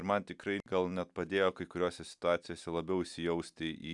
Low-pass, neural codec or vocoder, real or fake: 10.8 kHz; none; real